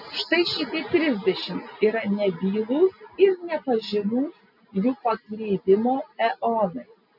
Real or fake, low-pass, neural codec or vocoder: real; 5.4 kHz; none